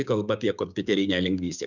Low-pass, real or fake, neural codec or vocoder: 7.2 kHz; fake; codec, 16 kHz, 6 kbps, DAC